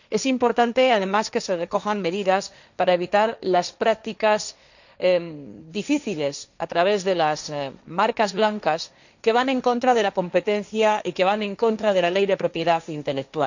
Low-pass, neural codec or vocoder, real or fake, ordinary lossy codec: 7.2 kHz; codec, 16 kHz, 1.1 kbps, Voila-Tokenizer; fake; none